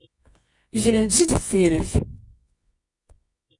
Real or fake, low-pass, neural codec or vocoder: fake; 10.8 kHz; codec, 24 kHz, 0.9 kbps, WavTokenizer, medium music audio release